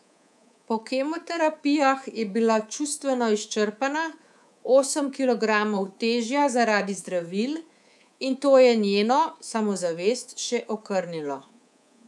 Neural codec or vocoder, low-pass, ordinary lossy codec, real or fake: codec, 24 kHz, 3.1 kbps, DualCodec; none; none; fake